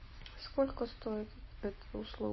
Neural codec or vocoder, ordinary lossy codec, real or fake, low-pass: none; MP3, 24 kbps; real; 7.2 kHz